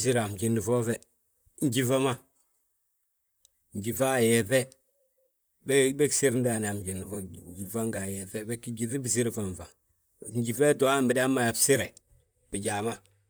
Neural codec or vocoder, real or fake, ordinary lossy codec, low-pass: vocoder, 44.1 kHz, 128 mel bands, Pupu-Vocoder; fake; none; none